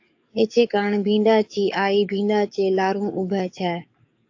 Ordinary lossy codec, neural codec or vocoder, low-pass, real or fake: AAC, 48 kbps; codec, 44.1 kHz, 7.8 kbps, DAC; 7.2 kHz; fake